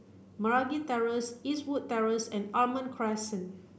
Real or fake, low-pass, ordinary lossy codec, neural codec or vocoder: real; none; none; none